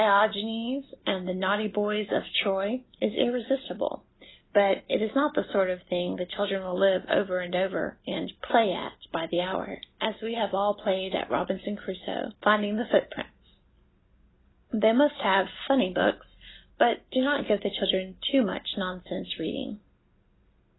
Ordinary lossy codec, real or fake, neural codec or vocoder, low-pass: AAC, 16 kbps; real; none; 7.2 kHz